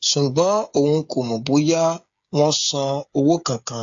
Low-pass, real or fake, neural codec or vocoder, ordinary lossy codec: 7.2 kHz; fake; codec, 16 kHz, 8 kbps, FreqCodec, smaller model; AAC, 64 kbps